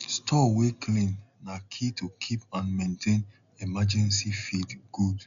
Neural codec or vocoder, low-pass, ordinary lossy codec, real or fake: none; 7.2 kHz; none; real